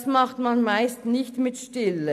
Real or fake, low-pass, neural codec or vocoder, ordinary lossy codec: real; 14.4 kHz; none; none